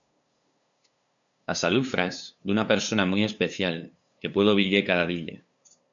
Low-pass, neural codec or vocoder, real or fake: 7.2 kHz; codec, 16 kHz, 2 kbps, FunCodec, trained on LibriTTS, 25 frames a second; fake